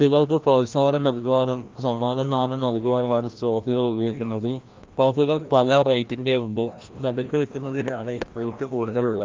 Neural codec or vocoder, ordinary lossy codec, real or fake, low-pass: codec, 16 kHz, 1 kbps, FreqCodec, larger model; Opus, 24 kbps; fake; 7.2 kHz